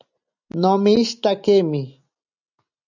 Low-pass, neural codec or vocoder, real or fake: 7.2 kHz; none; real